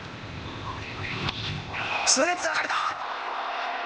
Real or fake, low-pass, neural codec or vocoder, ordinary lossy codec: fake; none; codec, 16 kHz, 0.8 kbps, ZipCodec; none